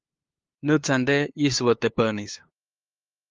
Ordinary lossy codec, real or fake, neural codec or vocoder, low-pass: Opus, 32 kbps; fake; codec, 16 kHz, 2 kbps, FunCodec, trained on LibriTTS, 25 frames a second; 7.2 kHz